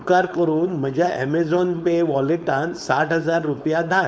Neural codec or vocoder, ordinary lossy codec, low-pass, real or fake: codec, 16 kHz, 4.8 kbps, FACodec; none; none; fake